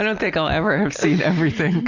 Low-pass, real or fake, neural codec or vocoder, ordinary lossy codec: 7.2 kHz; real; none; Opus, 64 kbps